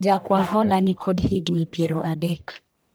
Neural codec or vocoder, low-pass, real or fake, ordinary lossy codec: codec, 44.1 kHz, 1.7 kbps, Pupu-Codec; none; fake; none